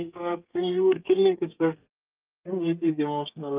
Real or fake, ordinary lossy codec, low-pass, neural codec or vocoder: fake; Opus, 24 kbps; 3.6 kHz; codec, 32 kHz, 1.9 kbps, SNAC